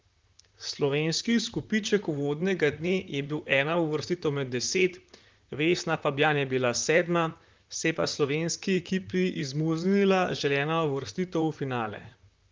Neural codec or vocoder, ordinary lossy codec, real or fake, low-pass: vocoder, 44.1 kHz, 128 mel bands, Pupu-Vocoder; Opus, 32 kbps; fake; 7.2 kHz